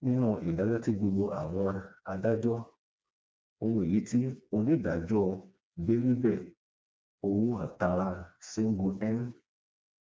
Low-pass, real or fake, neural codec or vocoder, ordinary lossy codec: none; fake; codec, 16 kHz, 2 kbps, FreqCodec, smaller model; none